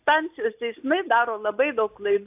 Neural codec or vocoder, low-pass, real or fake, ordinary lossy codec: none; 3.6 kHz; real; AAC, 32 kbps